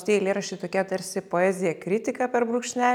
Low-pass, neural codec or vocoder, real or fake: 19.8 kHz; none; real